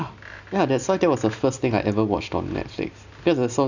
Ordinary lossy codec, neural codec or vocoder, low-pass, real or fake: none; none; 7.2 kHz; real